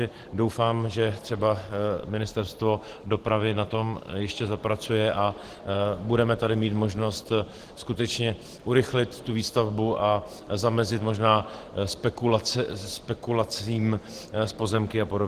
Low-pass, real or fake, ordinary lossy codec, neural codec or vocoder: 14.4 kHz; real; Opus, 16 kbps; none